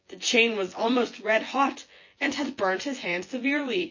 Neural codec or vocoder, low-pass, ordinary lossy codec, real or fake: vocoder, 24 kHz, 100 mel bands, Vocos; 7.2 kHz; MP3, 32 kbps; fake